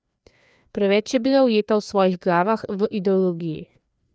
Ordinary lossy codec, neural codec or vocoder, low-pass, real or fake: none; codec, 16 kHz, 2 kbps, FreqCodec, larger model; none; fake